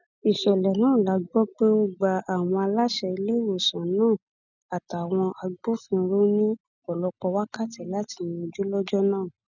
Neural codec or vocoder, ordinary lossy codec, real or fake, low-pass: none; none; real; 7.2 kHz